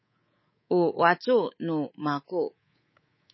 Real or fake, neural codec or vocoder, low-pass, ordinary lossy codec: fake; codec, 24 kHz, 3.1 kbps, DualCodec; 7.2 kHz; MP3, 24 kbps